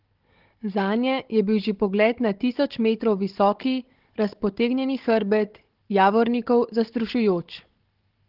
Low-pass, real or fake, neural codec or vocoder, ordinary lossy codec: 5.4 kHz; fake; codec, 16 kHz, 16 kbps, FunCodec, trained on Chinese and English, 50 frames a second; Opus, 16 kbps